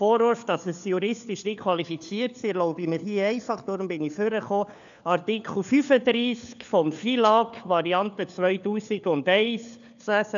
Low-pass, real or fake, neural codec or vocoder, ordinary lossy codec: 7.2 kHz; fake; codec, 16 kHz, 4 kbps, FunCodec, trained on LibriTTS, 50 frames a second; none